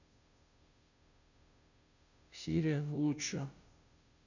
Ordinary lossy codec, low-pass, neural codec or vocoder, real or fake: none; 7.2 kHz; codec, 16 kHz, 0.5 kbps, FunCodec, trained on Chinese and English, 25 frames a second; fake